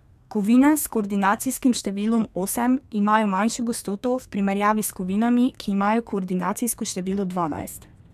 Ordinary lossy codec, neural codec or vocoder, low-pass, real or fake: none; codec, 32 kHz, 1.9 kbps, SNAC; 14.4 kHz; fake